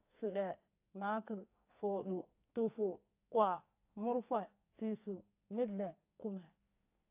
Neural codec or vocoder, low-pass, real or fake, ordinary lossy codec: codec, 44.1 kHz, 2.6 kbps, SNAC; 3.6 kHz; fake; AAC, 32 kbps